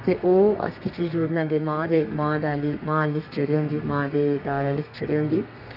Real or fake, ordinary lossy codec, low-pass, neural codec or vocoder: fake; none; 5.4 kHz; codec, 32 kHz, 1.9 kbps, SNAC